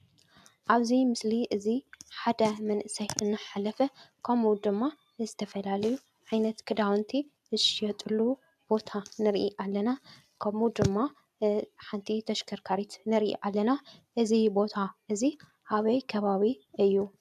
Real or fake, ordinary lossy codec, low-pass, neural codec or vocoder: real; AAC, 96 kbps; 14.4 kHz; none